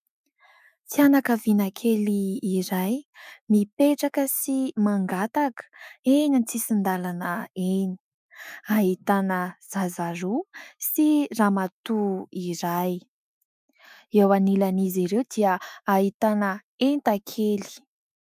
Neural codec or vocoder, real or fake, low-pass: autoencoder, 48 kHz, 128 numbers a frame, DAC-VAE, trained on Japanese speech; fake; 14.4 kHz